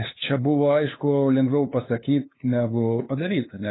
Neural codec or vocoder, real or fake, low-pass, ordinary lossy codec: codec, 16 kHz, 2 kbps, FunCodec, trained on LibriTTS, 25 frames a second; fake; 7.2 kHz; AAC, 16 kbps